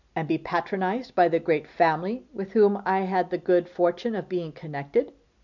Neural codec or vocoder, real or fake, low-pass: none; real; 7.2 kHz